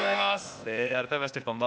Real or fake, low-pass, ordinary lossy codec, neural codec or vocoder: fake; none; none; codec, 16 kHz, 0.8 kbps, ZipCodec